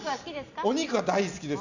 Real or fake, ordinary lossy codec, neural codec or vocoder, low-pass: real; none; none; 7.2 kHz